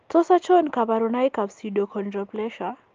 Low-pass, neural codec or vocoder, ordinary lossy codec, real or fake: 7.2 kHz; none; Opus, 32 kbps; real